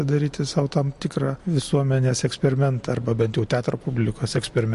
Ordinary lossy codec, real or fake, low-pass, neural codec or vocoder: MP3, 48 kbps; real; 14.4 kHz; none